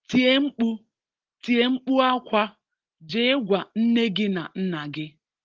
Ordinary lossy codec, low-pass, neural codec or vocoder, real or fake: Opus, 16 kbps; 7.2 kHz; none; real